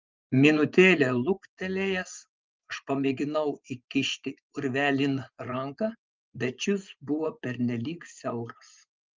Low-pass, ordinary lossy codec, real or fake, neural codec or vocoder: 7.2 kHz; Opus, 32 kbps; fake; vocoder, 24 kHz, 100 mel bands, Vocos